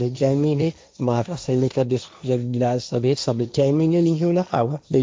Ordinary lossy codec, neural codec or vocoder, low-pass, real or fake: none; codec, 16 kHz, 1.1 kbps, Voila-Tokenizer; none; fake